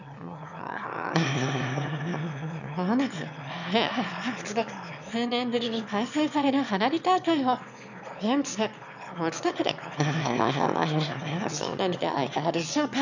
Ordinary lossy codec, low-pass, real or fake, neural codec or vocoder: none; 7.2 kHz; fake; autoencoder, 22.05 kHz, a latent of 192 numbers a frame, VITS, trained on one speaker